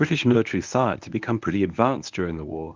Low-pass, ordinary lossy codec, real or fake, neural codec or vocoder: 7.2 kHz; Opus, 24 kbps; fake; codec, 24 kHz, 0.9 kbps, WavTokenizer, medium speech release version 1